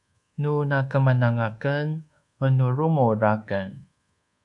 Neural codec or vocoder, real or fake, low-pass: codec, 24 kHz, 1.2 kbps, DualCodec; fake; 10.8 kHz